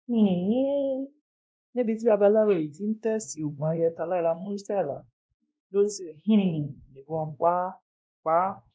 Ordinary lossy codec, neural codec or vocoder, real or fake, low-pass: none; codec, 16 kHz, 1 kbps, X-Codec, WavLM features, trained on Multilingual LibriSpeech; fake; none